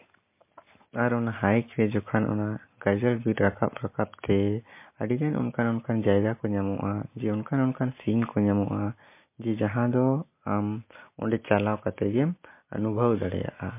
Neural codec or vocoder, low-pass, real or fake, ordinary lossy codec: none; 3.6 kHz; real; MP3, 24 kbps